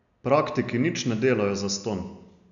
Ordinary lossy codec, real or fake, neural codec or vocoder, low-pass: none; real; none; 7.2 kHz